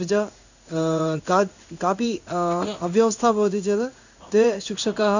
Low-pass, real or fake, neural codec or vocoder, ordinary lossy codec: 7.2 kHz; fake; codec, 16 kHz in and 24 kHz out, 1 kbps, XY-Tokenizer; none